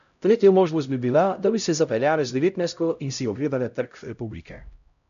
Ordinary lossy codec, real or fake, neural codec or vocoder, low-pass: none; fake; codec, 16 kHz, 0.5 kbps, X-Codec, HuBERT features, trained on LibriSpeech; 7.2 kHz